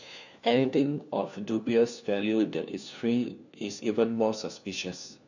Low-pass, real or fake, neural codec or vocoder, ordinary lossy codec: 7.2 kHz; fake; codec, 16 kHz, 1 kbps, FunCodec, trained on LibriTTS, 50 frames a second; none